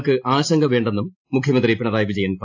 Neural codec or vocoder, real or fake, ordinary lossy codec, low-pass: none; real; AAC, 48 kbps; 7.2 kHz